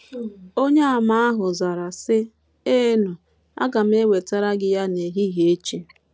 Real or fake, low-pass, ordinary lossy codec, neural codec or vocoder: real; none; none; none